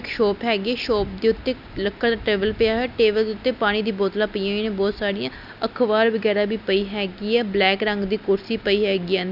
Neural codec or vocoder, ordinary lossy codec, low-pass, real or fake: none; none; 5.4 kHz; real